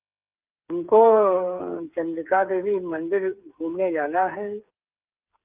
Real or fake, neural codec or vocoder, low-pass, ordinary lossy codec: fake; codec, 16 kHz, 8 kbps, FreqCodec, smaller model; 3.6 kHz; Opus, 24 kbps